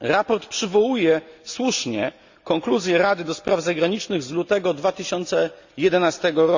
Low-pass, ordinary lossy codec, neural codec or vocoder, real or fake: 7.2 kHz; Opus, 64 kbps; none; real